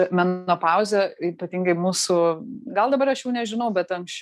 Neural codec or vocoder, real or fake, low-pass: none; real; 14.4 kHz